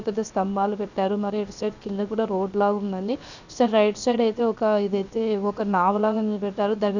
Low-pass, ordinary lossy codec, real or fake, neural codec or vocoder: 7.2 kHz; none; fake; codec, 16 kHz, 0.7 kbps, FocalCodec